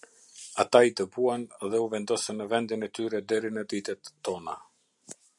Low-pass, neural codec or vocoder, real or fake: 10.8 kHz; none; real